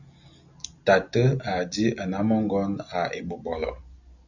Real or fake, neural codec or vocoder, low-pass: real; none; 7.2 kHz